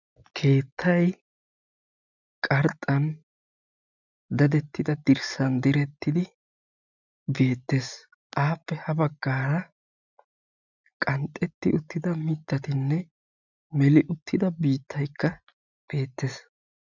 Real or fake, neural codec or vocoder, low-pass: real; none; 7.2 kHz